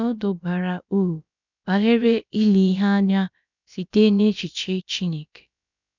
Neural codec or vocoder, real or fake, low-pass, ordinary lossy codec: codec, 16 kHz, about 1 kbps, DyCAST, with the encoder's durations; fake; 7.2 kHz; none